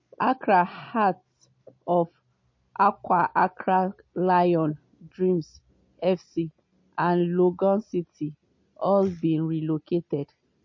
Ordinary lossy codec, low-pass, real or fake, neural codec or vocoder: MP3, 32 kbps; 7.2 kHz; real; none